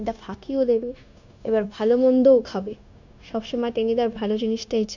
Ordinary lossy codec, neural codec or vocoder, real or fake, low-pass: none; codec, 16 kHz, 0.9 kbps, LongCat-Audio-Codec; fake; 7.2 kHz